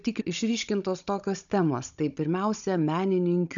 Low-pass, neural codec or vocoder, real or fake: 7.2 kHz; codec, 16 kHz, 16 kbps, FunCodec, trained on Chinese and English, 50 frames a second; fake